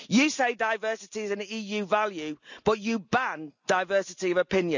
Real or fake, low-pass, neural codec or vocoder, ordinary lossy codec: real; 7.2 kHz; none; none